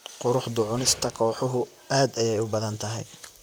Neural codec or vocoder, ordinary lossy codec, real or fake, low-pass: vocoder, 44.1 kHz, 128 mel bands, Pupu-Vocoder; none; fake; none